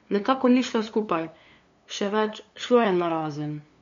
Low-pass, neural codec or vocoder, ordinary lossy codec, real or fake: 7.2 kHz; codec, 16 kHz, 2 kbps, FunCodec, trained on LibriTTS, 25 frames a second; MP3, 48 kbps; fake